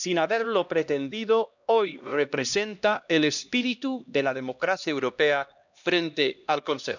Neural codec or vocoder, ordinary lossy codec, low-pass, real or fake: codec, 16 kHz, 1 kbps, X-Codec, HuBERT features, trained on LibriSpeech; none; 7.2 kHz; fake